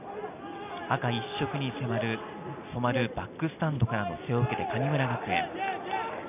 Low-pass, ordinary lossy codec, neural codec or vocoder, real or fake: 3.6 kHz; none; none; real